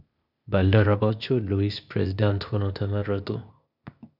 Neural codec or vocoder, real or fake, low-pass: codec, 16 kHz, 0.8 kbps, ZipCodec; fake; 5.4 kHz